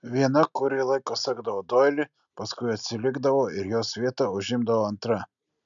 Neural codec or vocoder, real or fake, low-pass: none; real; 7.2 kHz